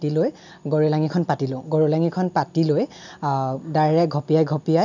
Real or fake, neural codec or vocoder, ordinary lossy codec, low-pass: real; none; none; 7.2 kHz